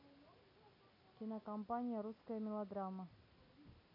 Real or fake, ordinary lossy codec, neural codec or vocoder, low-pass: real; MP3, 48 kbps; none; 5.4 kHz